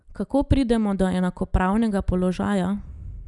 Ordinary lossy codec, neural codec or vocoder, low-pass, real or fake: none; none; 10.8 kHz; real